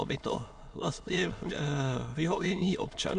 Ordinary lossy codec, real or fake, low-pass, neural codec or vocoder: AAC, 64 kbps; fake; 9.9 kHz; autoencoder, 22.05 kHz, a latent of 192 numbers a frame, VITS, trained on many speakers